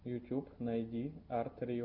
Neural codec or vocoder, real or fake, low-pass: none; real; 5.4 kHz